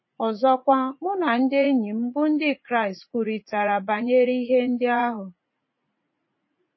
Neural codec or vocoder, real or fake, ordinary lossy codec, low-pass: vocoder, 44.1 kHz, 80 mel bands, Vocos; fake; MP3, 24 kbps; 7.2 kHz